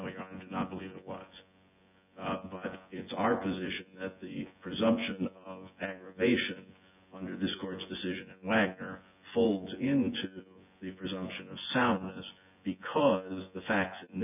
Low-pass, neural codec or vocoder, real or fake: 3.6 kHz; vocoder, 24 kHz, 100 mel bands, Vocos; fake